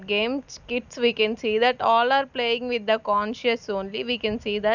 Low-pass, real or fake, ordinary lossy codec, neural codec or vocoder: 7.2 kHz; real; none; none